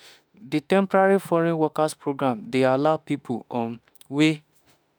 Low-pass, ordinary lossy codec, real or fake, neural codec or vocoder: none; none; fake; autoencoder, 48 kHz, 32 numbers a frame, DAC-VAE, trained on Japanese speech